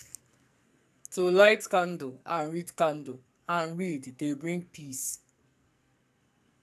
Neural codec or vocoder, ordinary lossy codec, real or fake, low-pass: codec, 44.1 kHz, 2.6 kbps, SNAC; AAC, 96 kbps; fake; 14.4 kHz